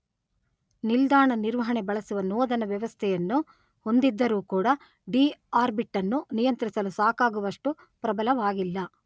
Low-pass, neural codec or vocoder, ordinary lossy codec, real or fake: none; none; none; real